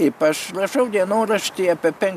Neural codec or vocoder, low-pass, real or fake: none; 14.4 kHz; real